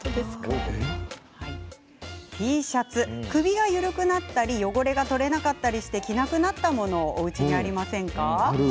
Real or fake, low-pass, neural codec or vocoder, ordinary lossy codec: real; none; none; none